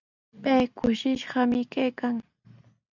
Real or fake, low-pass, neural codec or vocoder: real; 7.2 kHz; none